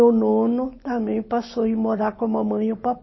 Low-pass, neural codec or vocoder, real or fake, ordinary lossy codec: 7.2 kHz; none; real; MP3, 24 kbps